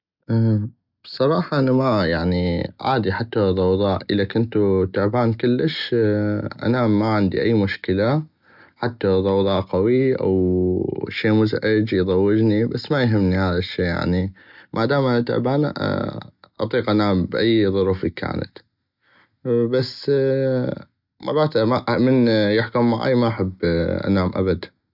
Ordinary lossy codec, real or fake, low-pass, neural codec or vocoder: none; real; 5.4 kHz; none